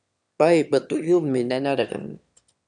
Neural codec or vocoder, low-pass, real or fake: autoencoder, 22.05 kHz, a latent of 192 numbers a frame, VITS, trained on one speaker; 9.9 kHz; fake